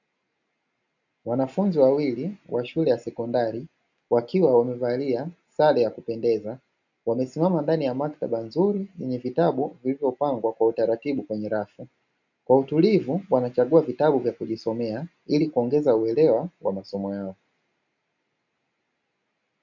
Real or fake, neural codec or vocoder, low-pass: real; none; 7.2 kHz